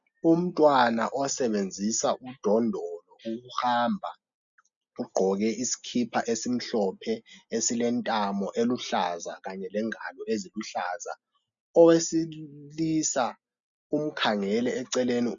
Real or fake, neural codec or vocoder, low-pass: real; none; 7.2 kHz